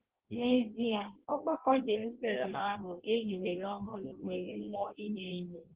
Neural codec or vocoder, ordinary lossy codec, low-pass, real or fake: codec, 16 kHz in and 24 kHz out, 0.6 kbps, FireRedTTS-2 codec; Opus, 16 kbps; 3.6 kHz; fake